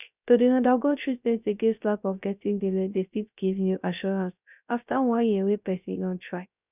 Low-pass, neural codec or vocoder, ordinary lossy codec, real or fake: 3.6 kHz; codec, 16 kHz, 0.3 kbps, FocalCodec; none; fake